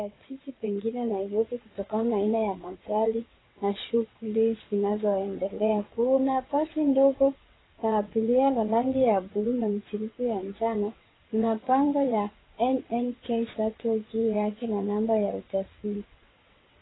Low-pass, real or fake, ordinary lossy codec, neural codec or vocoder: 7.2 kHz; fake; AAC, 16 kbps; vocoder, 22.05 kHz, 80 mel bands, WaveNeXt